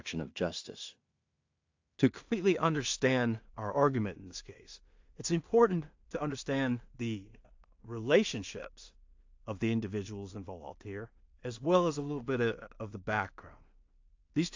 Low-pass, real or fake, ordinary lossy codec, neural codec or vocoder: 7.2 kHz; fake; AAC, 48 kbps; codec, 16 kHz in and 24 kHz out, 0.4 kbps, LongCat-Audio-Codec, two codebook decoder